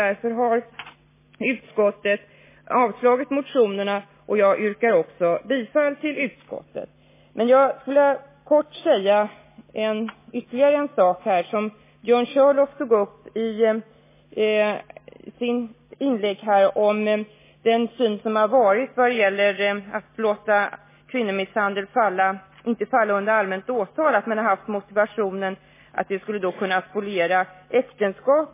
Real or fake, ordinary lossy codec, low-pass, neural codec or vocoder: real; MP3, 16 kbps; 3.6 kHz; none